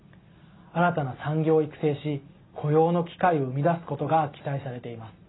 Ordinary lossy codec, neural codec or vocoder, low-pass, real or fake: AAC, 16 kbps; none; 7.2 kHz; real